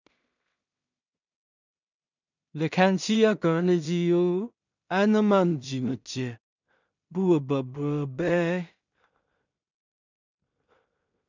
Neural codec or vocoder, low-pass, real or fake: codec, 16 kHz in and 24 kHz out, 0.4 kbps, LongCat-Audio-Codec, two codebook decoder; 7.2 kHz; fake